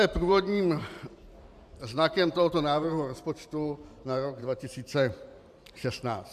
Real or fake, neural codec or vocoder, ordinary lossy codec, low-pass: fake; vocoder, 44.1 kHz, 128 mel bands every 512 samples, BigVGAN v2; MP3, 96 kbps; 14.4 kHz